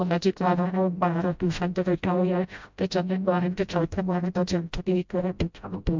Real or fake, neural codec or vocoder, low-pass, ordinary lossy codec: fake; codec, 16 kHz, 0.5 kbps, FreqCodec, smaller model; 7.2 kHz; MP3, 64 kbps